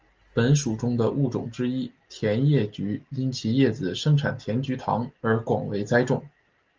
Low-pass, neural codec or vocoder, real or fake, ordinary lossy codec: 7.2 kHz; none; real; Opus, 24 kbps